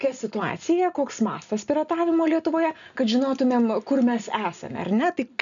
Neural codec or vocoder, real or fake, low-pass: none; real; 7.2 kHz